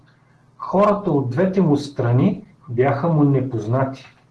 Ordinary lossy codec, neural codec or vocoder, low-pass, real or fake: Opus, 16 kbps; none; 10.8 kHz; real